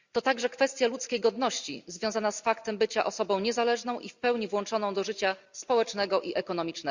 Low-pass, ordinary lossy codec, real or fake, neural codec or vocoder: 7.2 kHz; Opus, 64 kbps; real; none